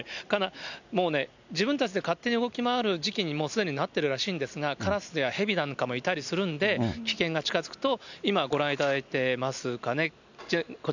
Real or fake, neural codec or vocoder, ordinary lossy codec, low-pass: real; none; none; 7.2 kHz